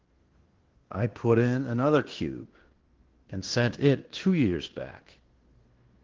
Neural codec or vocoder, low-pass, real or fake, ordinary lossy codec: codec, 16 kHz in and 24 kHz out, 0.9 kbps, LongCat-Audio-Codec, fine tuned four codebook decoder; 7.2 kHz; fake; Opus, 16 kbps